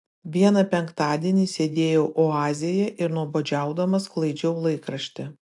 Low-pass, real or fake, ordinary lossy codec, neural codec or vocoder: 10.8 kHz; real; AAC, 64 kbps; none